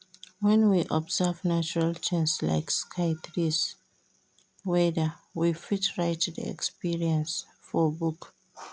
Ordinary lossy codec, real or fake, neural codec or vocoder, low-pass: none; real; none; none